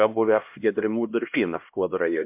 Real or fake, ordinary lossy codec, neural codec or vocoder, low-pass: fake; MP3, 32 kbps; codec, 16 kHz, 1 kbps, X-Codec, HuBERT features, trained on LibriSpeech; 3.6 kHz